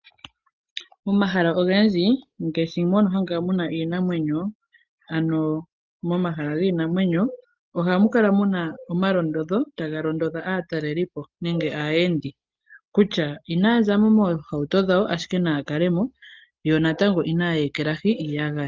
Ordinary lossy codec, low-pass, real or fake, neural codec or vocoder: Opus, 32 kbps; 7.2 kHz; real; none